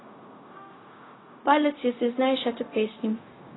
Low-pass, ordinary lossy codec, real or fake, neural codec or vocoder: 7.2 kHz; AAC, 16 kbps; fake; codec, 16 kHz, 0.4 kbps, LongCat-Audio-Codec